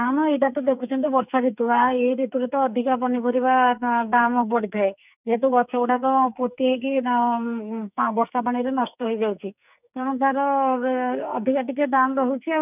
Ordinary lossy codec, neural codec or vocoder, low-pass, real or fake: none; codec, 44.1 kHz, 2.6 kbps, SNAC; 3.6 kHz; fake